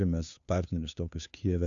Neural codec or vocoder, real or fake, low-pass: codec, 16 kHz, 2 kbps, FunCodec, trained on Chinese and English, 25 frames a second; fake; 7.2 kHz